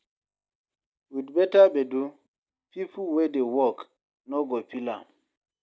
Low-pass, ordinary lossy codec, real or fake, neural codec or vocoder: none; none; real; none